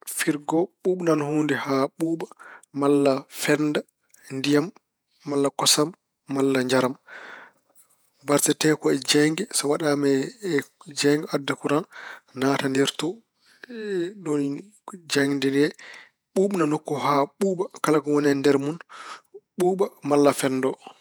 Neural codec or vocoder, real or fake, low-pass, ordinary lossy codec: vocoder, 48 kHz, 128 mel bands, Vocos; fake; none; none